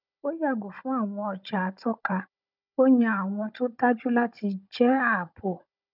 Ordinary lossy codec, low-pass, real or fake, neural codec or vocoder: none; 5.4 kHz; fake; codec, 16 kHz, 16 kbps, FunCodec, trained on Chinese and English, 50 frames a second